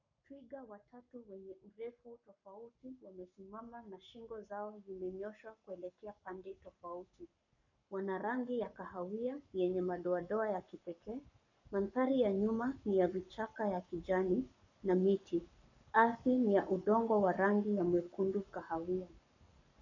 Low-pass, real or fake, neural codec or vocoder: 7.2 kHz; fake; codec, 44.1 kHz, 7.8 kbps, Pupu-Codec